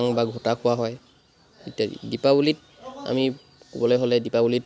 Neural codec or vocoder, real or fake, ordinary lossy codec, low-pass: none; real; none; none